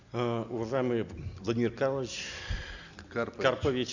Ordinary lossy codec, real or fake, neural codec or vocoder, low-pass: Opus, 64 kbps; real; none; 7.2 kHz